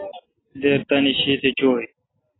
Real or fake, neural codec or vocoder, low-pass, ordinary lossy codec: real; none; 7.2 kHz; AAC, 16 kbps